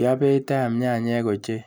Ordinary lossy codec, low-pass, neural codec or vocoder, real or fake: none; none; none; real